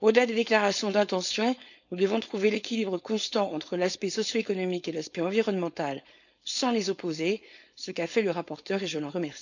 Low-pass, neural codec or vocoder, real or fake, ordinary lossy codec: 7.2 kHz; codec, 16 kHz, 4.8 kbps, FACodec; fake; none